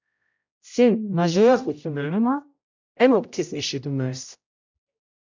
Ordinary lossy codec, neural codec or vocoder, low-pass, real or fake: MP3, 64 kbps; codec, 16 kHz, 0.5 kbps, X-Codec, HuBERT features, trained on general audio; 7.2 kHz; fake